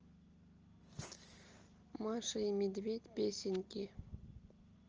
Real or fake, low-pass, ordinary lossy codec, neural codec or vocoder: real; 7.2 kHz; Opus, 24 kbps; none